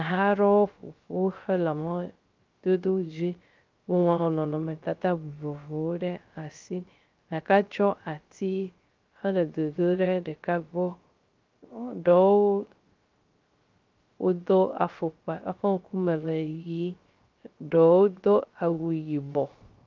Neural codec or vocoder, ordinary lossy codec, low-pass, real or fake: codec, 16 kHz, 0.3 kbps, FocalCodec; Opus, 24 kbps; 7.2 kHz; fake